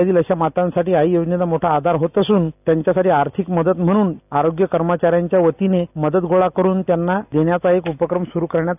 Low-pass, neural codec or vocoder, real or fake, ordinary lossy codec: 3.6 kHz; none; real; none